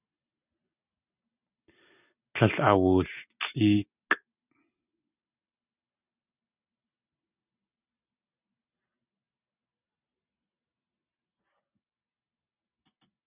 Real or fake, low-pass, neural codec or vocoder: real; 3.6 kHz; none